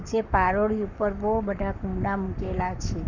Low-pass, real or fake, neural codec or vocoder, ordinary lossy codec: 7.2 kHz; fake; codec, 44.1 kHz, 7.8 kbps, Pupu-Codec; none